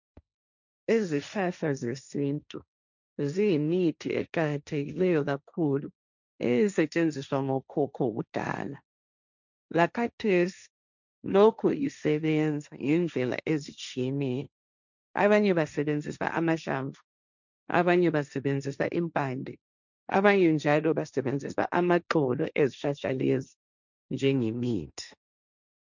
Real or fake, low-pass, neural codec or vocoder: fake; 7.2 kHz; codec, 16 kHz, 1.1 kbps, Voila-Tokenizer